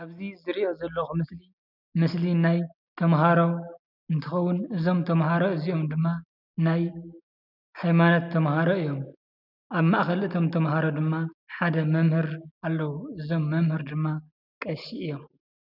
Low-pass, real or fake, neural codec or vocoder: 5.4 kHz; real; none